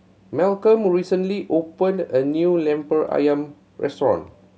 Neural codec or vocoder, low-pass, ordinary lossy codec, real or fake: none; none; none; real